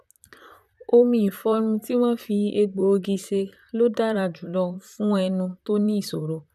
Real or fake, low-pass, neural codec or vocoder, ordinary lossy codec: fake; 14.4 kHz; vocoder, 44.1 kHz, 128 mel bands, Pupu-Vocoder; none